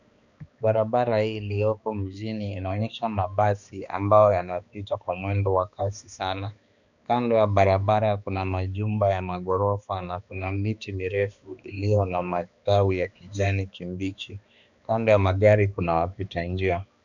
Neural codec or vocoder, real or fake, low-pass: codec, 16 kHz, 2 kbps, X-Codec, HuBERT features, trained on balanced general audio; fake; 7.2 kHz